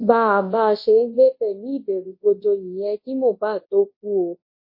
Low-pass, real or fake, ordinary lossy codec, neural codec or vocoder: 5.4 kHz; fake; MP3, 24 kbps; codec, 24 kHz, 0.9 kbps, WavTokenizer, large speech release